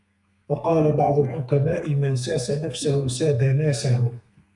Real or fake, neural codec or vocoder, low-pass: fake; codec, 32 kHz, 1.9 kbps, SNAC; 10.8 kHz